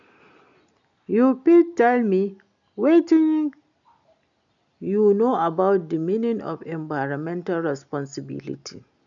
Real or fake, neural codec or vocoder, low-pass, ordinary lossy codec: real; none; 7.2 kHz; none